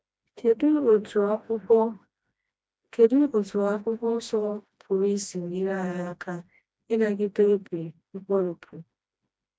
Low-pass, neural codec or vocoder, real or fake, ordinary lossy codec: none; codec, 16 kHz, 1 kbps, FreqCodec, smaller model; fake; none